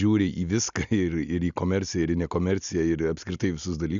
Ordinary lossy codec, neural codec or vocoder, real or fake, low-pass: MP3, 96 kbps; none; real; 7.2 kHz